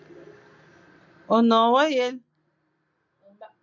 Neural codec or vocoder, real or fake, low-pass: none; real; 7.2 kHz